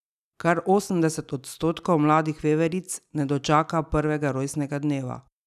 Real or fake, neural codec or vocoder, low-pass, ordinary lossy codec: real; none; 14.4 kHz; none